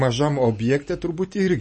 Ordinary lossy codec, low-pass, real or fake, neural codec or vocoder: MP3, 32 kbps; 10.8 kHz; real; none